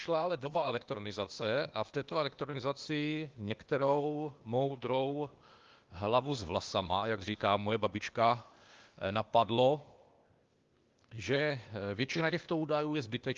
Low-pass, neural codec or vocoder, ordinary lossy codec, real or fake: 7.2 kHz; codec, 16 kHz, 0.8 kbps, ZipCodec; Opus, 32 kbps; fake